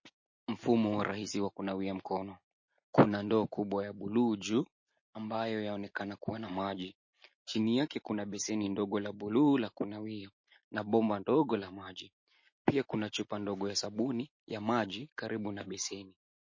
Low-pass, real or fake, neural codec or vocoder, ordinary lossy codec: 7.2 kHz; real; none; MP3, 32 kbps